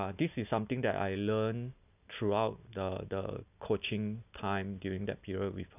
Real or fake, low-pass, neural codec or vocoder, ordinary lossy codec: real; 3.6 kHz; none; none